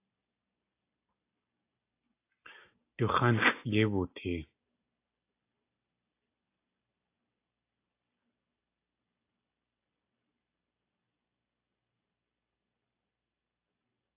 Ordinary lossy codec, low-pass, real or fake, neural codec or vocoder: AAC, 24 kbps; 3.6 kHz; real; none